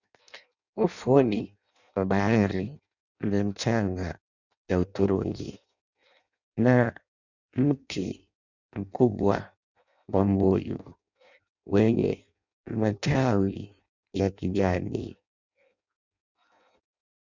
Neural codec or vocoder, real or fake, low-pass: codec, 16 kHz in and 24 kHz out, 0.6 kbps, FireRedTTS-2 codec; fake; 7.2 kHz